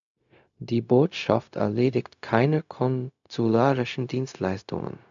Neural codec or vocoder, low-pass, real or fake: codec, 16 kHz, 0.4 kbps, LongCat-Audio-Codec; 7.2 kHz; fake